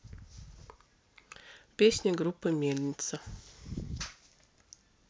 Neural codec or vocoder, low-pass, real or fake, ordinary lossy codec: none; none; real; none